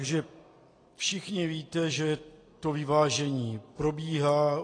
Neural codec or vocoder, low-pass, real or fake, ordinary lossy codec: none; 9.9 kHz; real; AAC, 32 kbps